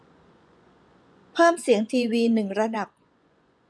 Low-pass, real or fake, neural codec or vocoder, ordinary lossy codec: none; fake; vocoder, 24 kHz, 100 mel bands, Vocos; none